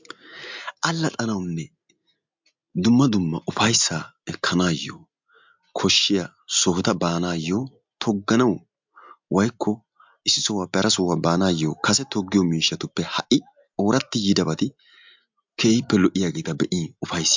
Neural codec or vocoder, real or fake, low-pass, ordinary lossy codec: none; real; 7.2 kHz; MP3, 64 kbps